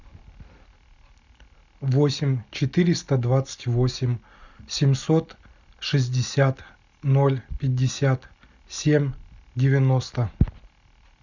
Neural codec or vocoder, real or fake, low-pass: none; real; 7.2 kHz